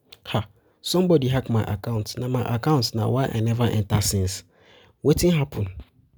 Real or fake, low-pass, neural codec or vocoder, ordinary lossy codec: real; none; none; none